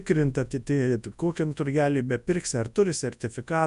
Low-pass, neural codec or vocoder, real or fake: 10.8 kHz; codec, 24 kHz, 0.9 kbps, WavTokenizer, large speech release; fake